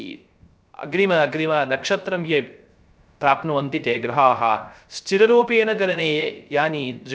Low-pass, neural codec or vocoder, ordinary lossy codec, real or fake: none; codec, 16 kHz, 0.3 kbps, FocalCodec; none; fake